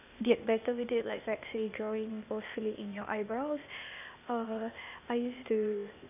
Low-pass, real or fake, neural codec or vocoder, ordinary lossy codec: 3.6 kHz; fake; codec, 16 kHz, 0.8 kbps, ZipCodec; none